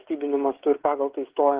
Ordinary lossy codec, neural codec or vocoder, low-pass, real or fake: Opus, 16 kbps; codec, 16 kHz, 16 kbps, FreqCodec, smaller model; 3.6 kHz; fake